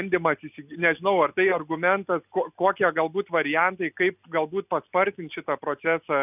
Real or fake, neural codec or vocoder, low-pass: real; none; 3.6 kHz